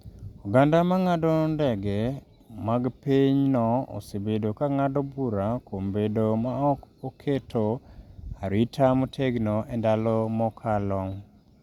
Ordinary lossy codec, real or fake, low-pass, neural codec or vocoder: Opus, 64 kbps; real; 19.8 kHz; none